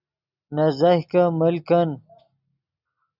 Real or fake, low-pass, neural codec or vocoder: real; 5.4 kHz; none